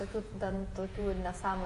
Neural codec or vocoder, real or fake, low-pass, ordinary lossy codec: vocoder, 48 kHz, 128 mel bands, Vocos; fake; 14.4 kHz; MP3, 48 kbps